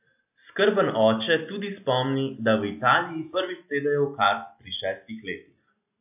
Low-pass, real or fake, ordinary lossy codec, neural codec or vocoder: 3.6 kHz; real; AAC, 32 kbps; none